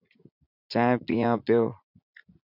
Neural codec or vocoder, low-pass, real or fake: vocoder, 44.1 kHz, 80 mel bands, Vocos; 5.4 kHz; fake